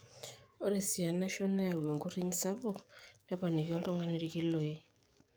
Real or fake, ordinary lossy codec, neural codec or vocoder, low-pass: fake; none; codec, 44.1 kHz, 7.8 kbps, DAC; none